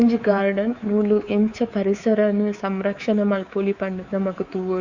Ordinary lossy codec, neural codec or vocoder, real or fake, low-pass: Opus, 64 kbps; codec, 24 kHz, 3.1 kbps, DualCodec; fake; 7.2 kHz